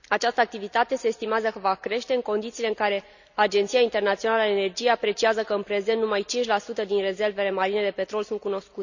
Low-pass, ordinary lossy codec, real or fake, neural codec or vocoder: 7.2 kHz; none; real; none